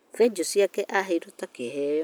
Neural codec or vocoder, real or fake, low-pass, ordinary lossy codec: none; real; none; none